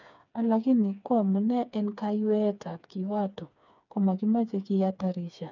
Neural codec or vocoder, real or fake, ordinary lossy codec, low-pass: codec, 16 kHz, 4 kbps, FreqCodec, smaller model; fake; none; 7.2 kHz